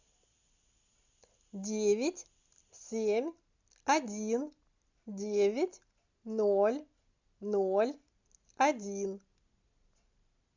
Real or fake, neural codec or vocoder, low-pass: real; none; 7.2 kHz